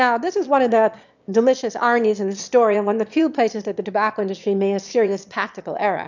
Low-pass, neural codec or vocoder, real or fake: 7.2 kHz; autoencoder, 22.05 kHz, a latent of 192 numbers a frame, VITS, trained on one speaker; fake